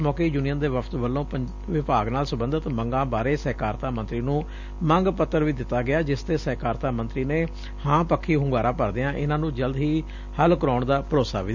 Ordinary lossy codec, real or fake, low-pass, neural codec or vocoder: none; real; 7.2 kHz; none